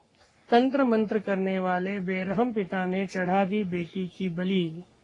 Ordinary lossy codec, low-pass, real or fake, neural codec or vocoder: AAC, 32 kbps; 10.8 kHz; fake; codec, 44.1 kHz, 3.4 kbps, Pupu-Codec